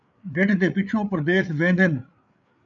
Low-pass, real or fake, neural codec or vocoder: 7.2 kHz; fake; codec, 16 kHz, 8 kbps, FreqCodec, larger model